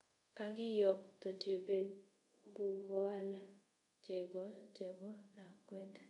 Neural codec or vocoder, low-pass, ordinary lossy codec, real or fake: codec, 24 kHz, 0.5 kbps, DualCodec; 10.8 kHz; MP3, 64 kbps; fake